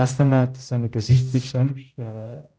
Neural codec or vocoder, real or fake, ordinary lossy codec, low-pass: codec, 16 kHz, 0.5 kbps, X-Codec, HuBERT features, trained on general audio; fake; none; none